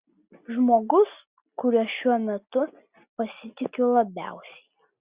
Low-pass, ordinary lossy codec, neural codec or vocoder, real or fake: 3.6 kHz; Opus, 64 kbps; none; real